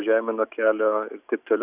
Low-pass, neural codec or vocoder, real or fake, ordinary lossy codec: 3.6 kHz; none; real; Opus, 64 kbps